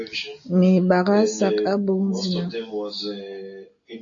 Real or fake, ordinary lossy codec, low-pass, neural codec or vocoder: real; AAC, 64 kbps; 7.2 kHz; none